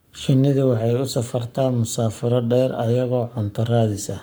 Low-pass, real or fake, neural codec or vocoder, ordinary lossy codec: none; fake; codec, 44.1 kHz, 7.8 kbps, Pupu-Codec; none